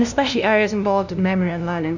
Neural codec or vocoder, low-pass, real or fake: codec, 16 kHz, 0.5 kbps, FunCodec, trained on LibriTTS, 25 frames a second; 7.2 kHz; fake